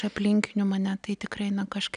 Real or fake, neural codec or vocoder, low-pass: real; none; 9.9 kHz